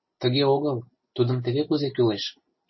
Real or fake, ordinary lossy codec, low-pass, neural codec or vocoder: real; MP3, 24 kbps; 7.2 kHz; none